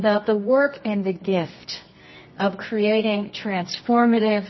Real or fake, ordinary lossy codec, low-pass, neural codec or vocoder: fake; MP3, 24 kbps; 7.2 kHz; codec, 24 kHz, 0.9 kbps, WavTokenizer, medium music audio release